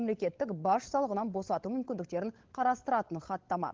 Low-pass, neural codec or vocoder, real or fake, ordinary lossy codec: 7.2 kHz; codec, 16 kHz, 16 kbps, FunCodec, trained on LibriTTS, 50 frames a second; fake; Opus, 16 kbps